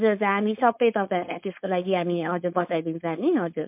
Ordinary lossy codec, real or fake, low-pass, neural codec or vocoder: MP3, 32 kbps; fake; 3.6 kHz; codec, 16 kHz, 4.8 kbps, FACodec